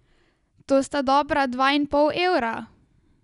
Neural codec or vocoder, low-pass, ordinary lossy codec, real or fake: none; 10.8 kHz; none; real